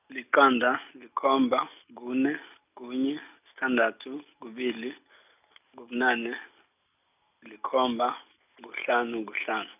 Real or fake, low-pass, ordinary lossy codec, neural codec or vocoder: real; 3.6 kHz; none; none